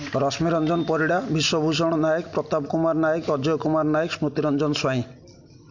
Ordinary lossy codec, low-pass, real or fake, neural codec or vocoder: MP3, 64 kbps; 7.2 kHz; real; none